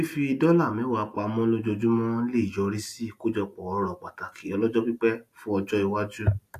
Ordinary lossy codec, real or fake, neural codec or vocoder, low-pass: AAC, 64 kbps; real; none; 14.4 kHz